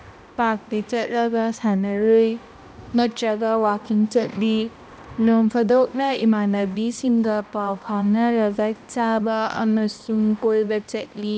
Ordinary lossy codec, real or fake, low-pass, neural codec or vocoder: none; fake; none; codec, 16 kHz, 1 kbps, X-Codec, HuBERT features, trained on balanced general audio